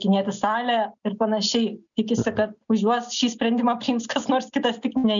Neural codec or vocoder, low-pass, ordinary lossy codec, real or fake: none; 7.2 kHz; MP3, 96 kbps; real